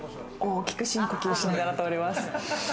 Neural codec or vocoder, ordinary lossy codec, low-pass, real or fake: none; none; none; real